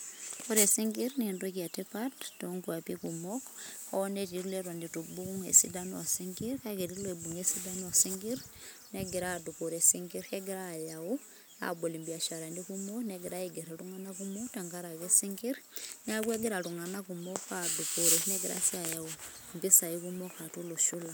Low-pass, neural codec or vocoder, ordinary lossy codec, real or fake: none; none; none; real